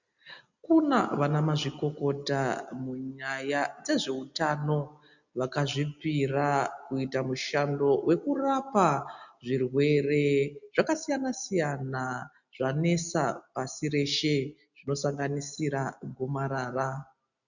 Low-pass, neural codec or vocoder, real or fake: 7.2 kHz; none; real